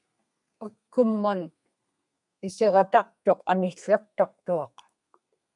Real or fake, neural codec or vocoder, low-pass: fake; codec, 32 kHz, 1.9 kbps, SNAC; 10.8 kHz